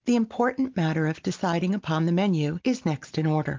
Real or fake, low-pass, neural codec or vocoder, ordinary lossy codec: real; 7.2 kHz; none; Opus, 32 kbps